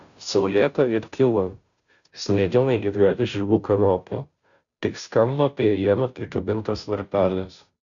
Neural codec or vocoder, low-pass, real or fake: codec, 16 kHz, 0.5 kbps, FunCodec, trained on Chinese and English, 25 frames a second; 7.2 kHz; fake